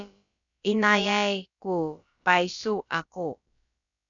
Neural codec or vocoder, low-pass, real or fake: codec, 16 kHz, about 1 kbps, DyCAST, with the encoder's durations; 7.2 kHz; fake